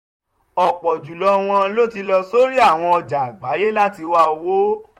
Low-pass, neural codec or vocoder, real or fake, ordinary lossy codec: 19.8 kHz; vocoder, 44.1 kHz, 128 mel bands, Pupu-Vocoder; fake; MP3, 64 kbps